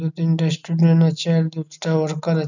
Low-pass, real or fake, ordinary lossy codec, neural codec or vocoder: 7.2 kHz; real; none; none